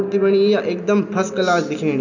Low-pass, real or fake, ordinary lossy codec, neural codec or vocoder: 7.2 kHz; real; none; none